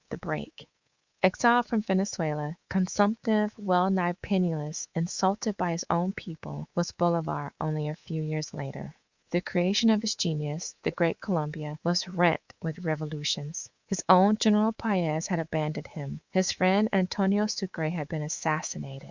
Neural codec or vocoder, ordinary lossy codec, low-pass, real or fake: codec, 24 kHz, 3.1 kbps, DualCodec; Opus, 64 kbps; 7.2 kHz; fake